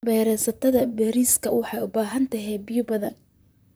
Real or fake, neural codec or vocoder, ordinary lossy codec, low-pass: fake; vocoder, 44.1 kHz, 128 mel bands, Pupu-Vocoder; none; none